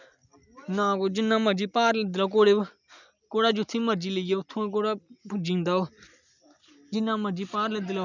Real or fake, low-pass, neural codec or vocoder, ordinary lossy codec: real; 7.2 kHz; none; none